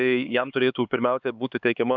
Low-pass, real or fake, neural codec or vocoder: 7.2 kHz; fake; codec, 16 kHz, 4 kbps, X-Codec, HuBERT features, trained on LibriSpeech